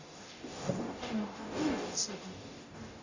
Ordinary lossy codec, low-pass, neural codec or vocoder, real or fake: Opus, 64 kbps; 7.2 kHz; codec, 44.1 kHz, 0.9 kbps, DAC; fake